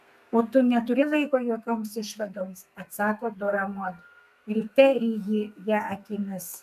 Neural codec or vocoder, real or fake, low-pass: codec, 32 kHz, 1.9 kbps, SNAC; fake; 14.4 kHz